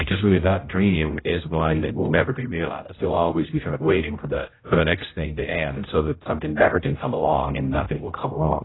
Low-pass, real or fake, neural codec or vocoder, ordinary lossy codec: 7.2 kHz; fake; codec, 16 kHz, 0.5 kbps, X-Codec, HuBERT features, trained on general audio; AAC, 16 kbps